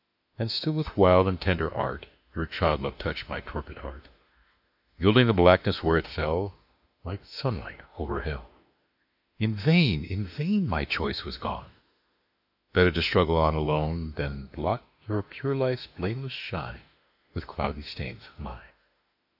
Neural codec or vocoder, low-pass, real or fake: autoencoder, 48 kHz, 32 numbers a frame, DAC-VAE, trained on Japanese speech; 5.4 kHz; fake